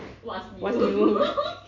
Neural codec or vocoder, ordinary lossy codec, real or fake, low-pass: none; MP3, 48 kbps; real; 7.2 kHz